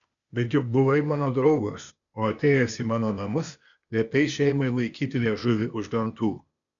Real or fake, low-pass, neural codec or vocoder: fake; 7.2 kHz; codec, 16 kHz, 0.8 kbps, ZipCodec